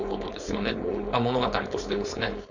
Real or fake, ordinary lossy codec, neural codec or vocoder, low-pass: fake; none; codec, 16 kHz, 4.8 kbps, FACodec; 7.2 kHz